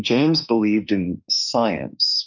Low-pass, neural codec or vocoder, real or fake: 7.2 kHz; autoencoder, 48 kHz, 32 numbers a frame, DAC-VAE, trained on Japanese speech; fake